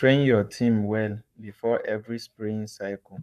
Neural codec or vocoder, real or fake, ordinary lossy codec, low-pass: codec, 44.1 kHz, 7.8 kbps, Pupu-Codec; fake; none; 14.4 kHz